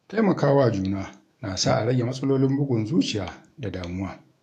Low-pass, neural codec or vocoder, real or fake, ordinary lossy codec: 14.4 kHz; autoencoder, 48 kHz, 128 numbers a frame, DAC-VAE, trained on Japanese speech; fake; AAC, 64 kbps